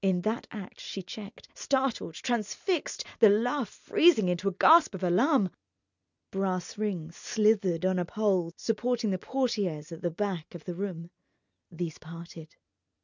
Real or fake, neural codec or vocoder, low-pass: real; none; 7.2 kHz